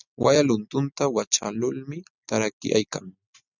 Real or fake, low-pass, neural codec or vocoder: real; 7.2 kHz; none